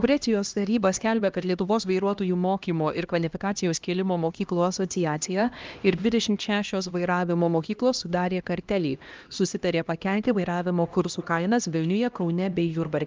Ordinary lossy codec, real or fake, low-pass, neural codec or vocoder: Opus, 24 kbps; fake; 7.2 kHz; codec, 16 kHz, 1 kbps, X-Codec, HuBERT features, trained on LibriSpeech